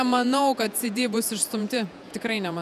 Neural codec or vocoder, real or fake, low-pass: vocoder, 48 kHz, 128 mel bands, Vocos; fake; 14.4 kHz